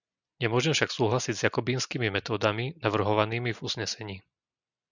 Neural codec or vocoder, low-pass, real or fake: none; 7.2 kHz; real